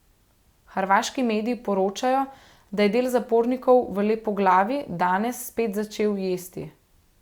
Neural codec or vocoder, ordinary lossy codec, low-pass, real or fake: none; Opus, 64 kbps; 19.8 kHz; real